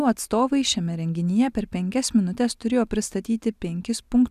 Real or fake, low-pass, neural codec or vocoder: real; 14.4 kHz; none